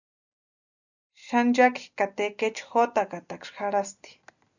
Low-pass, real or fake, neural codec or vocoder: 7.2 kHz; real; none